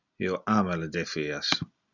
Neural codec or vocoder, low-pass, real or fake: none; 7.2 kHz; real